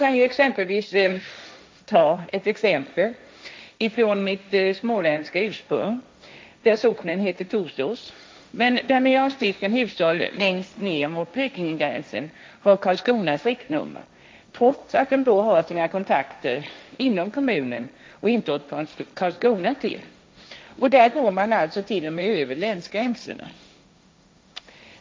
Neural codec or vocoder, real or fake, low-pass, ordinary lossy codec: codec, 16 kHz, 1.1 kbps, Voila-Tokenizer; fake; none; none